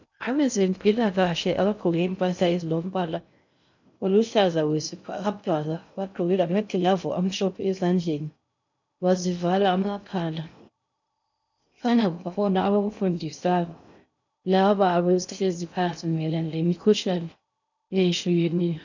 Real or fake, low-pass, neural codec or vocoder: fake; 7.2 kHz; codec, 16 kHz in and 24 kHz out, 0.6 kbps, FocalCodec, streaming, 2048 codes